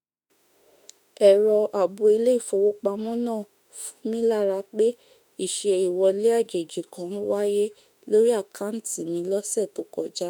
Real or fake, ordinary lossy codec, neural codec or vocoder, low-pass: fake; none; autoencoder, 48 kHz, 32 numbers a frame, DAC-VAE, trained on Japanese speech; none